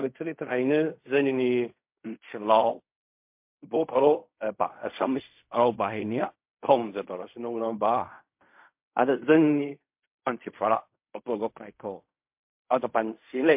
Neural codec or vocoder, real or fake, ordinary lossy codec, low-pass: codec, 16 kHz in and 24 kHz out, 0.4 kbps, LongCat-Audio-Codec, fine tuned four codebook decoder; fake; MP3, 32 kbps; 3.6 kHz